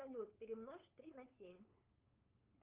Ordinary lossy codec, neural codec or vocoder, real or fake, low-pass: Opus, 32 kbps; codec, 16 kHz, 8 kbps, FunCodec, trained on LibriTTS, 25 frames a second; fake; 3.6 kHz